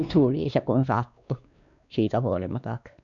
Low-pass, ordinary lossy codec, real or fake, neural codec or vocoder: 7.2 kHz; none; fake; codec, 16 kHz, 2 kbps, X-Codec, WavLM features, trained on Multilingual LibriSpeech